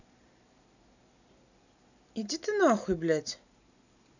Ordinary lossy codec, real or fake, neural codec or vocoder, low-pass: none; real; none; 7.2 kHz